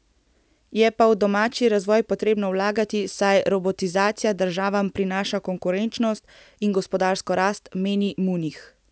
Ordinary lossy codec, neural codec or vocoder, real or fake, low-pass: none; none; real; none